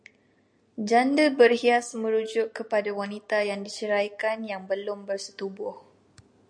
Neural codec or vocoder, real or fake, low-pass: none; real; 9.9 kHz